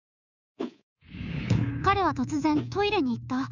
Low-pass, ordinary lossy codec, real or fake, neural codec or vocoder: 7.2 kHz; none; fake; codec, 24 kHz, 3.1 kbps, DualCodec